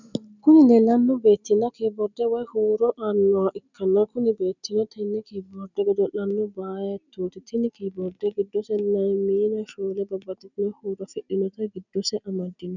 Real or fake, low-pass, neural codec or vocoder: real; 7.2 kHz; none